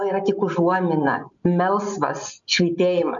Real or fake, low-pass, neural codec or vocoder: real; 7.2 kHz; none